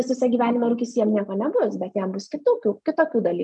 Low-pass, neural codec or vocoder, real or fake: 9.9 kHz; none; real